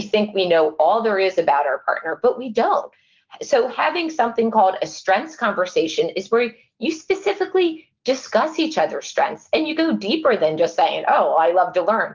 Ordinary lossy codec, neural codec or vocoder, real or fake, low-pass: Opus, 32 kbps; none; real; 7.2 kHz